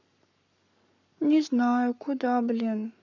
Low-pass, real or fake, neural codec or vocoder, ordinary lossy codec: 7.2 kHz; fake; codec, 44.1 kHz, 7.8 kbps, Pupu-Codec; none